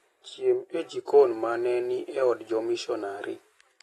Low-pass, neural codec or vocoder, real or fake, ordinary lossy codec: 19.8 kHz; none; real; AAC, 32 kbps